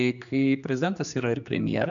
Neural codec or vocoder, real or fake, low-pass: codec, 16 kHz, 2 kbps, X-Codec, HuBERT features, trained on general audio; fake; 7.2 kHz